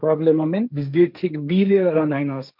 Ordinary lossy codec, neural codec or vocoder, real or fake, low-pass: none; codec, 16 kHz, 1.1 kbps, Voila-Tokenizer; fake; 5.4 kHz